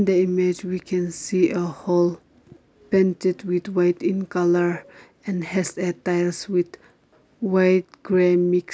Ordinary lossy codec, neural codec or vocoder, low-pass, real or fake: none; none; none; real